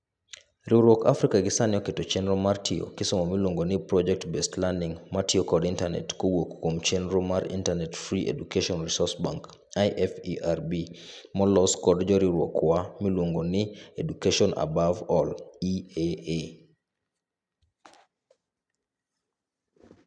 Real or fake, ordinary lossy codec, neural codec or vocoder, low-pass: real; none; none; 9.9 kHz